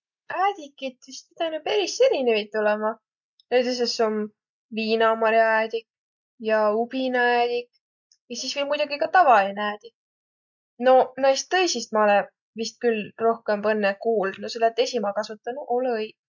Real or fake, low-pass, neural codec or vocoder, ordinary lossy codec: real; 7.2 kHz; none; none